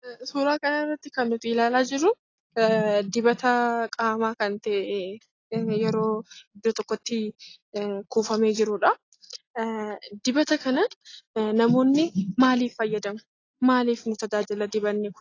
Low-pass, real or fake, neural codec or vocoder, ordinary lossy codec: 7.2 kHz; real; none; AAC, 32 kbps